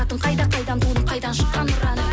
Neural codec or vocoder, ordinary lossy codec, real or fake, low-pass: none; none; real; none